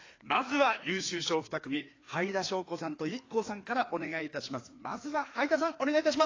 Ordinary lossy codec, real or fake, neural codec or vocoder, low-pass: AAC, 32 kbps; fake; codec, 16 kHz, 2 kbps, FreqCodec, larger model; 7.2 kHz